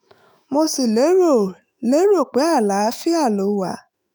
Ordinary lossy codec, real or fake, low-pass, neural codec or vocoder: none; fake; none; autoencoder, 48 kHz, 128 numbers a frame, DAC-VAE, trained on Japanese speech